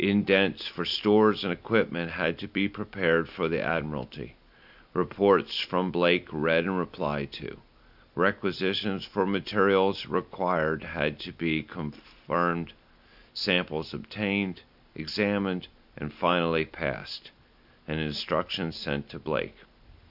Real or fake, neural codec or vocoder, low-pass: real; none; 5.4 kHz